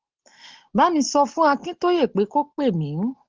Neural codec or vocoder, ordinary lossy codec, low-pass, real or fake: none; Opus, 16 kbps; 7.2 kHz; real